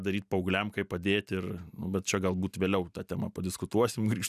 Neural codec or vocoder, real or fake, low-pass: none; real; 14.4 kHz